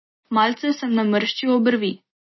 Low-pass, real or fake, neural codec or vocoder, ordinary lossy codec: 7.2 kHz; real; none; MP3, 24 kbps